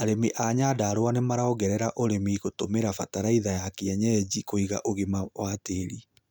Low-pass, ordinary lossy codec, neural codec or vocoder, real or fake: none; none; none; real